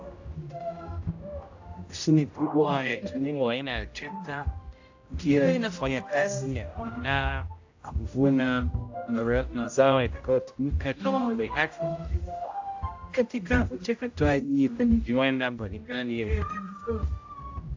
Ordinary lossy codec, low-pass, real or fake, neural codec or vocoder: AAC, 48 kbps; 7.2 kHz; fake; codec, 16 kHz, 0.5 kbps, X-Codec, HuBERT features, trained on general audio